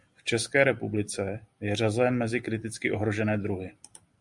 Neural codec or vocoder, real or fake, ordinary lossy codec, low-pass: none; real; Opus, 64 kbps; 10.8 kHz